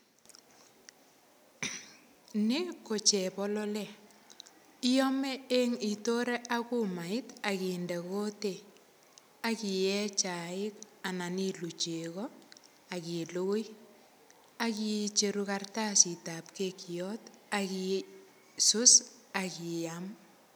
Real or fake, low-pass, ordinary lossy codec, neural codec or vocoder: real; none; none; none